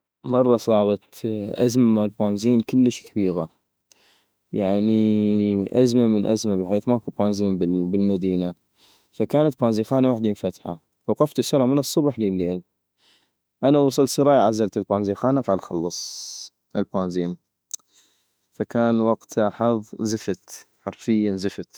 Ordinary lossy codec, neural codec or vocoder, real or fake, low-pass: none; autoencoder, 48 kHz, 32 numbers a frame, DAC-VAE, trained on Japanese speech; fake; none